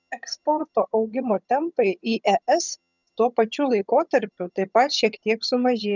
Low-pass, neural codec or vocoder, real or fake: 7.2 kHz; vocoder, 22.05 kHz, 80 mel bands, HiFi-GAN; fake